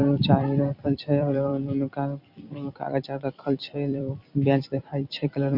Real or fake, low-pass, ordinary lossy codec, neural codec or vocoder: fake; 5.4 kHz; none; vocoder, 44.1 kHz, 128 mel bands every 256 samples, BigVGAN v2